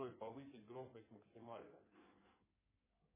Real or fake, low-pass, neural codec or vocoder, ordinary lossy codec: fake; 3.6 kHz; codec, 16 kHz in and 24 kHz out, 2.2 kbps, FireRedTTS-2 codec; MP3, 16 kbps